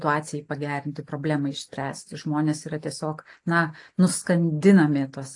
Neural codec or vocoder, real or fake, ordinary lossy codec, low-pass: none; real; AAC, 48 kbps; 10.8 kHz